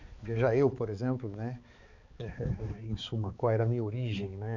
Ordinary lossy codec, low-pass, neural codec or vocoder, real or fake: none; 7.2 kHz; codec, 16 kHz, 4 kbps, X-Codec, HuBERT features, trained on balanced general audio; fake